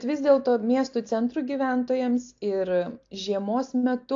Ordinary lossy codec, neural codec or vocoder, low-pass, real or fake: AAC, 48 kbps; none; 7.2 kHz; real